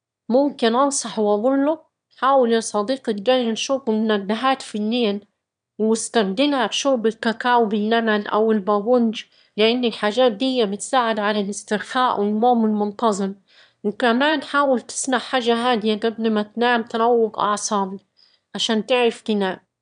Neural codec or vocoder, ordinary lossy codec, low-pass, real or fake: autoencoder, 22.05 kHz, a latent of 192 numbers a frame, VITS, trained on one speaker; none; 9.9 kHz; fake